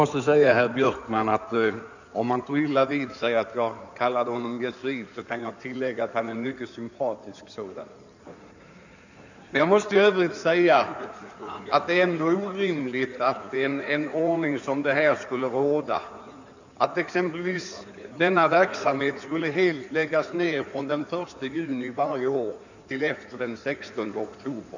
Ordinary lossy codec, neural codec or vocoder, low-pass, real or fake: none; codec, 16 kHz in and 24 kHz out, 2.2 kbps, FireRedTTS-2 codec; 7.2 kHz; fake